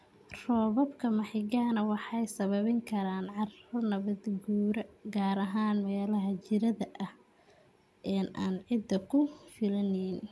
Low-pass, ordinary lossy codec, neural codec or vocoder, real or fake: none; none; none; real